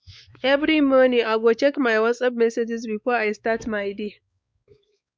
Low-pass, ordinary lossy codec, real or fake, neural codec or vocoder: none; none; fake; codec, 16 kHz, 4 kbps, X-Codec, WavLM features, trained on Multilingual LibriSpeech